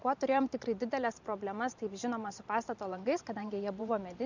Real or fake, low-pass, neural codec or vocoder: fake; 7.2 kHz; vocoder, 44.1 kHz, 128 mel bands every 512 samples, BigVGAN v2